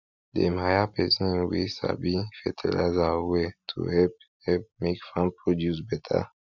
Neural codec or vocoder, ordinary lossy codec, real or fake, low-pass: none; none; real; 7.2 kHz